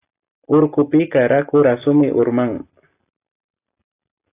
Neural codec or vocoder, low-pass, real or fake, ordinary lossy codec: none; 3.6 kHz; real; AAC, 24 kbps